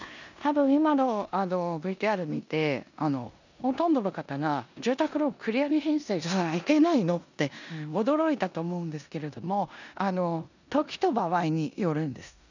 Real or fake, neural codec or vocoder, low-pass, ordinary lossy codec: fake; codec, 16 kHz in and 24 kHz out, 0.9 kbps, LongCat-Audio-Codec, four codebook decoder; 7.2 kHz; none